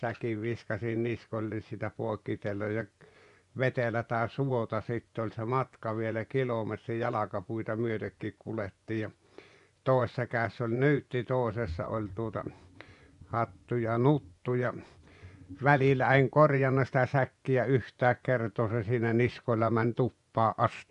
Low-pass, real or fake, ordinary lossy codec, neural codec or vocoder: 10.8 kHz; fake; none; vocoder, 24 kHz, 100 mel bands, Vocos